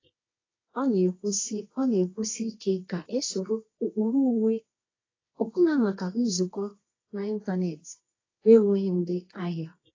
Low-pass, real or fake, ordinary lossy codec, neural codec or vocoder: 7.2 kHz; fake; AAC, 32 kbps; codec, 24 kHz, 0.9 kbps, WavTokenizer, medium music audio release